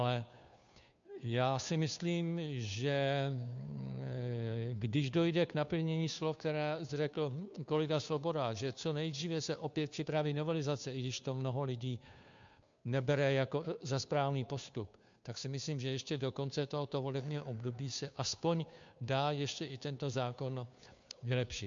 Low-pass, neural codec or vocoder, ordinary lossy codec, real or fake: 7.2 kHz; codec, 16 kHz, 2 kbps, FunCodec, trained on Chinese and English, 25 frames a second; MP3, 64 kbps; fake